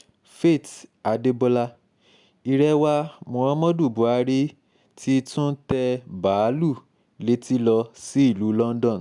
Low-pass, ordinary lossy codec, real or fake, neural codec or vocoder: 10.8 kHz; none; real; none